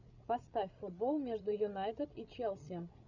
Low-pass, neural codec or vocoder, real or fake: 7.2 kHz; codec, 16 kHz, 16 kbps, FreqCodec, larger model; fake